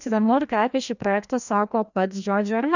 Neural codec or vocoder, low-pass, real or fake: codec, 16 kHz, 1 kbps, FreqCodec, larger model; 7.2 kHz; fake